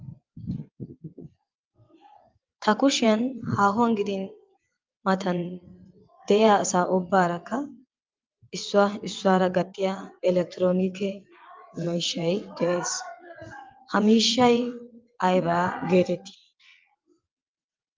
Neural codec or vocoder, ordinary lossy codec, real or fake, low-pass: vocoder, 22.05 kHz, 80 mel bands, WaveNeXt; Opus, 24 kbps; fake; 7.2 kHz